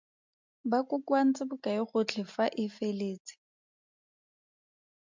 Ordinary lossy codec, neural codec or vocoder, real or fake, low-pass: MP3, 64 kbps; none; real; 7.2 kHz